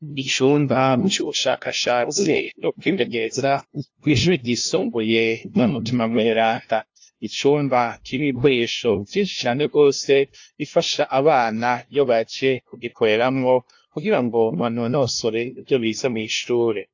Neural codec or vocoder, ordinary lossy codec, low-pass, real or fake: codec, 16 kHz, 0.5 kbps, FunCodec, trained on LibriTTS, 25 frames a second; AAC, 48 kbps; 7.2 kHz; fake